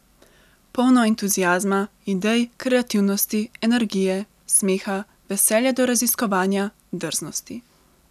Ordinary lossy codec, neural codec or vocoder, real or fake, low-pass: none; none; real; 14.4 kHz